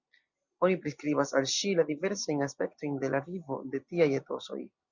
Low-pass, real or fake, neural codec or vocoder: 7.2 kHz; real; none